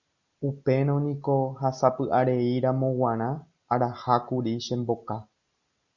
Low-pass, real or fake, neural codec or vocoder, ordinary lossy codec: 7.2 kHz; real; none; Opus, 64 kbps